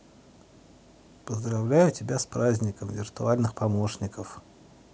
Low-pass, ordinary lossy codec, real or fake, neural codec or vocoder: none; none; real; none